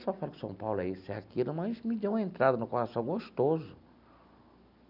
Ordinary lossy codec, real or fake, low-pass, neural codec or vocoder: none; real; 5.4 kHz; none